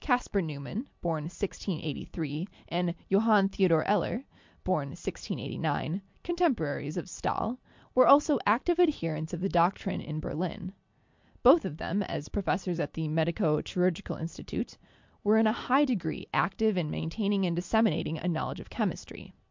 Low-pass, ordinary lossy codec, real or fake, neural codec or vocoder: 7.2 kHz; MP3, 64 kbps; real; none